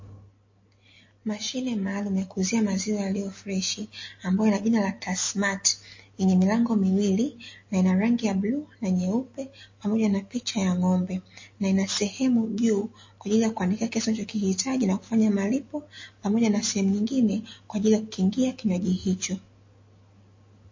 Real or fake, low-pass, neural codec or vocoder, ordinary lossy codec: real; 7.2 kHz; none; MP3, 32 kbps